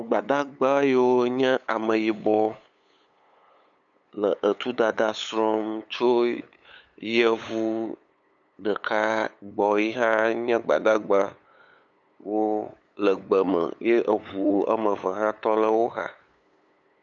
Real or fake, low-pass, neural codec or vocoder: fake; 7.2 kHz; codec, 16 kHz, 8 kbps, FunCodec, trained on LibriTTS, 25 frames a second